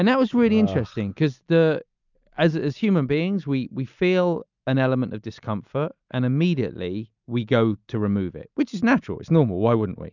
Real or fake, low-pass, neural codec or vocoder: real; 7.2 kHz; none